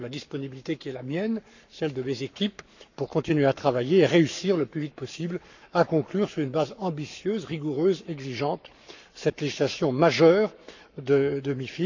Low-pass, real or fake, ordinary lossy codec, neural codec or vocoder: 7.2 kHz; fake; none; codec, 44.1 kHz, 7.8 kbps, Pupu-Codec